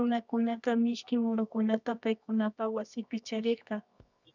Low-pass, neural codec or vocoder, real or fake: 7.2 kHz; codec, 24 kHz, 0.9 kbps, WavTokenizer, medium music audio release; fake